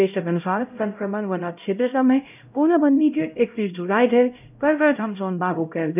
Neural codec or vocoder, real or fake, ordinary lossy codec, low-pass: codec, 16 kHz, 0.5 kbps, X-Codec, HuBERT features, trained on LibriSpeech; fake; none; 3.6 kHz